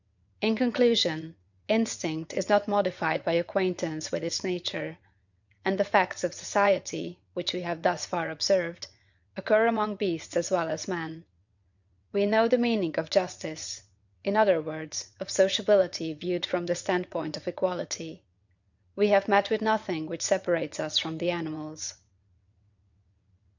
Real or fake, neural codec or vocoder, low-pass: fake; vocoder, 22.05 kHz, 80 mel bands, WaveNeXt; 7.2 kHz